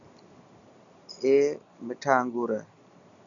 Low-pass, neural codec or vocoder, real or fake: 7.2 kHz; none; real